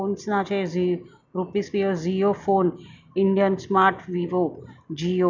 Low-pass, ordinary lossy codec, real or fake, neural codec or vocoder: 7.2 kHz; none; real; none